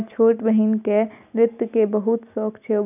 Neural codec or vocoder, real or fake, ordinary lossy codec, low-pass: none; real; none; 3.6 kHz